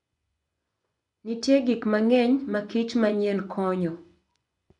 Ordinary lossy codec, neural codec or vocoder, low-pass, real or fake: none; vocoder, 24 kHz, 100 mel bands, Vocos; 10.8 kHz; fake